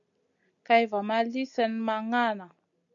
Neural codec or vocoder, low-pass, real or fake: none; 7.2 kHz; real